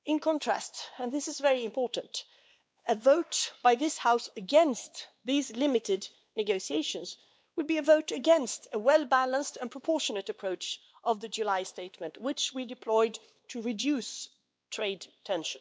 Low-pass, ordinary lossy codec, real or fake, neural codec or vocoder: none; none; fake; codec, 16 kHz, 2 kbps, X-Codec, WavLM features, trained on Multilingual LibriSpeech